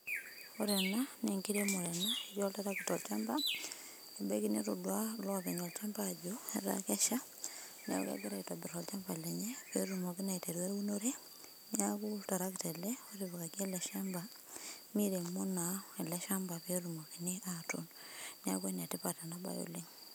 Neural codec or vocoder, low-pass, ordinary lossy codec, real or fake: none; none; none; real